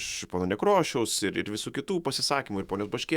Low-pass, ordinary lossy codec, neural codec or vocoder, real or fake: 19.8 kHz; Opus, 64 kbps; autoencoder, 48 kHz, 128 numbers a frame, DAC-VAE, trained on Japanese speech; fake